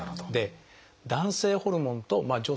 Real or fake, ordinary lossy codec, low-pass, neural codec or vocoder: real; none; none; none